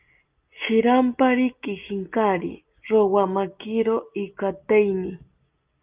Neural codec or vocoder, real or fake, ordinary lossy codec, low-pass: none; real; Opus, 24 kbps; 3.6 kHz